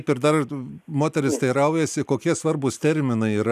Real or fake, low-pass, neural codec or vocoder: real; 14.4 kHz; none